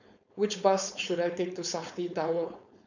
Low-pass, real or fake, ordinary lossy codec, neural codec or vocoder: 7.2 kHz; fake; none; codec, 16 kHz, 4.8 kbps, FACodec